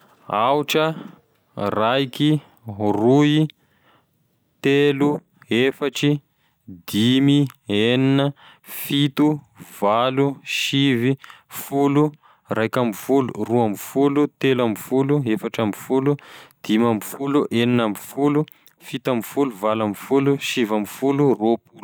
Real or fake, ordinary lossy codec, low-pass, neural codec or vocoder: real; none; none; none